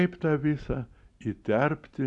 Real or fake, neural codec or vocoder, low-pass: real; none; 10.8 kHz